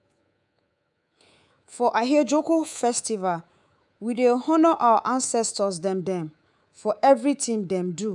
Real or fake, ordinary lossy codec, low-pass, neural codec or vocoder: fake; none; 10.8 kHz; codec, 24 kHz, 3.1 kbps, DualCodec